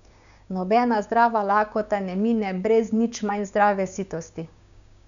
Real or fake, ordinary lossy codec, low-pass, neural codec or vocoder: fake; none; 7.2 kHz; codec, 16 kHz, 6 kbps, DAC